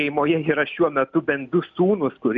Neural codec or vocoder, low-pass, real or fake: none; 7.2 kHz; real